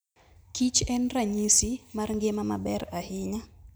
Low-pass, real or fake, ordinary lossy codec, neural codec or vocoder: none; real; none; none